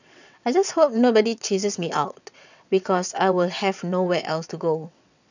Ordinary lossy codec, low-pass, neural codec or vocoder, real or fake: none; 7.2 kHz; vocoder, 22.05 kHz, 80 mel bands, WaveNeXt; fake